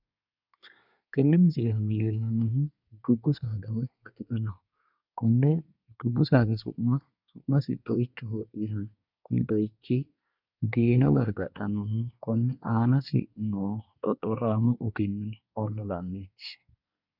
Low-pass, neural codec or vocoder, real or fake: 5.4 kHz; codec, 24 kHz, 1 kbps, SNAC; fake